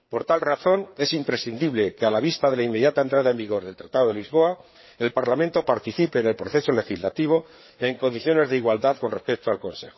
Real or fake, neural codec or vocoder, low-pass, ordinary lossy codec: fake; codec, 16 kHz, 4 kbps, FreqCodec, larger model; 7.2 kHz; MP3, 24 kbps